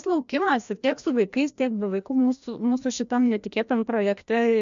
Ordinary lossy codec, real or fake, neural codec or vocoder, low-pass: MP3, 64 kbps; fake; codec, 16 kHz, 1 kbps, FreqCodec, larger model; 7.2 kHz